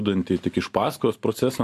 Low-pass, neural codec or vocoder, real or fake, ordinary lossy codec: 14.4 kHz; none; real; AAC, 64 kbps